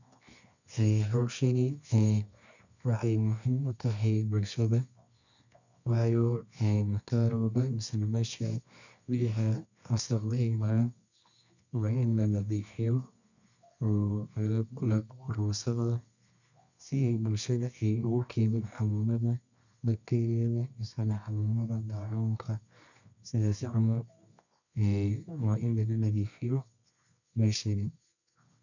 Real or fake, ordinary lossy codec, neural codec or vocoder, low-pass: fake; none; codec, 24 kHz, 0.9 kbps, WavTokenizer, medium music audio release; 7.2 kHz